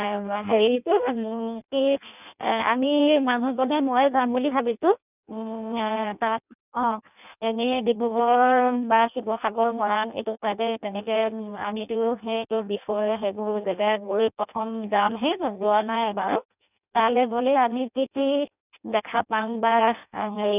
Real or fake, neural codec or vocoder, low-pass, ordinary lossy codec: fake; codec, 16 kHz in and 24 kHz out, 0.6 kbps, FireRedTTS-2 codec; 3.6 kHz; none